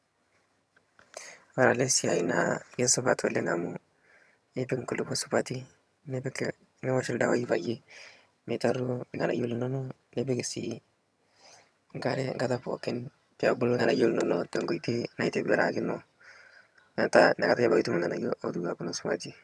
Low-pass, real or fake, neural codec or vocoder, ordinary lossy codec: none; fake; vocoder, 22.05 kHz, 80 mel bands, HiFi-GAN; none